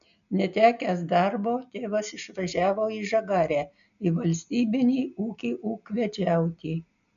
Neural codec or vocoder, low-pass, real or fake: none; 7.2 kHz; real